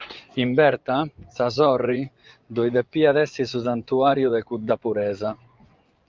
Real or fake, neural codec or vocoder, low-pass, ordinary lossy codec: fake; vocoder, 24 kHz, 100 mel bands, Vocos; 7.2 kHz; Opus, 24 kbps